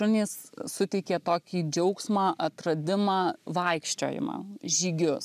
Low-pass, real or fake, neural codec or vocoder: 14.4 kHz; real; none